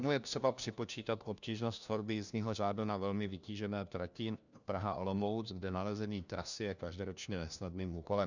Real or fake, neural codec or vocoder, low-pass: fake; codec, 16 kHz, 1 kbps, FunCodec, trained on LibriTTS, 50 frames a second; 7.2 kHz